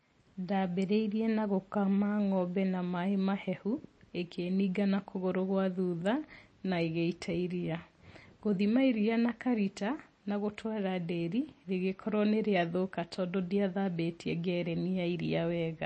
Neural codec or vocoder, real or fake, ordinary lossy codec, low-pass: none; real; MP3, 32 kbps; 9.9 kHz